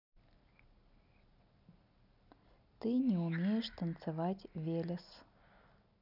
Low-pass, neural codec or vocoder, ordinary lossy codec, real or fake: 5.4 kHz; none; none; real